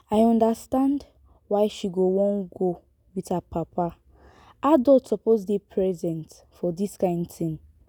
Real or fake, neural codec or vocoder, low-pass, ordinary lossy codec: real; none; none; none